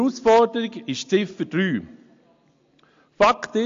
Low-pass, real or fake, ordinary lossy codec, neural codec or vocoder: 7.2 kHz; real; AAC, 48 kbps; none